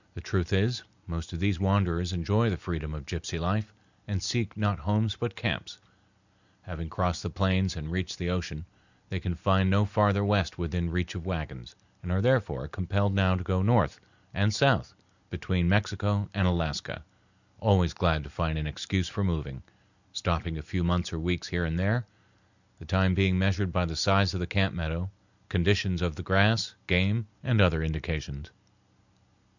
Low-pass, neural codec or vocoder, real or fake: 7.2 kHz; none; real